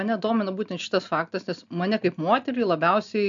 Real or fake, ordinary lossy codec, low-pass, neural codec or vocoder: real; MP3, 64 kbps; 7.2 kHz; none